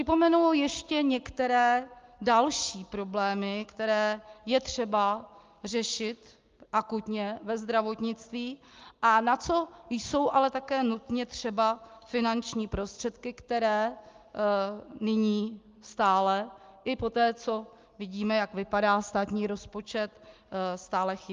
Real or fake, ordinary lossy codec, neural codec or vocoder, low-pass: real; Opus, 32 kbps; none; 7.2 kHz